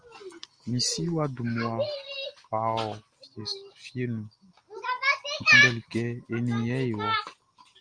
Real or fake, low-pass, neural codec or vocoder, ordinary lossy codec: real; 9.9 kHz; none; Opus, 32 kbps